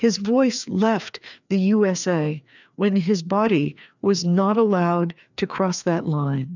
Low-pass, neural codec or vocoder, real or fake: 7.2 kHz; codec, 16 kHz, 2 kbps, FreqCodec, larger model; fake